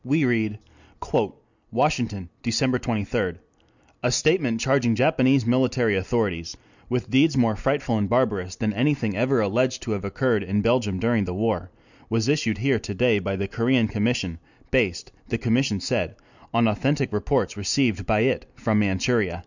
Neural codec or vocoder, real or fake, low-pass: none; real; 7.2 kHz